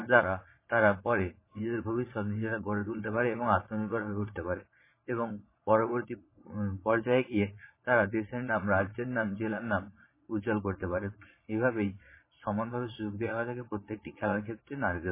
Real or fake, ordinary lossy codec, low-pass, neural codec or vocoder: fake; MP3, 16 kbps; 3.6 kHz; vocoder, 22.05 kHz, 80 mel bands, Vocos